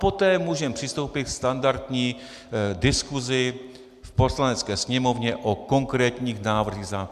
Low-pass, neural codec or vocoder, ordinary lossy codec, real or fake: 14.4 kHz; none; MP3, 96 kbps; real